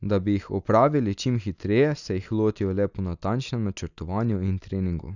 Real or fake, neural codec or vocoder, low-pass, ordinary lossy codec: real; none; 7.2 kHz; none